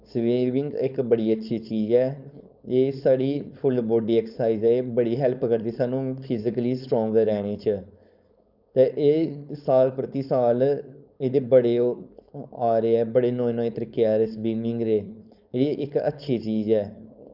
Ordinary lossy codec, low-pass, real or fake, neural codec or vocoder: none; 5.4 kHz; fake; codec, 16 kHz, 4.8 kbps, FACodec